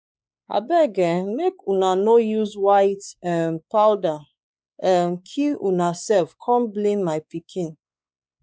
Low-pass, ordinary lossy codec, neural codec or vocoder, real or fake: none; none; codec, 16 kHz, 4 kbps, X-Codec, WavLM features, trained on Multilingual LibriSpeech; fake